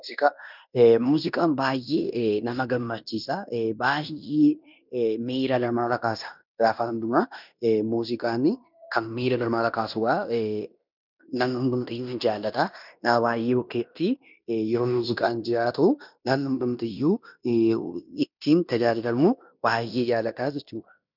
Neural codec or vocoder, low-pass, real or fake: codec, 16 kHz in and 24 kHz out, 0.9 kbps, LongCat-Audio-Codec, fine tuned four codebook decoder; 5.4 kHz; fake